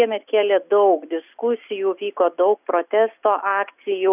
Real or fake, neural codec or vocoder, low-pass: real; none; 3.6 kHz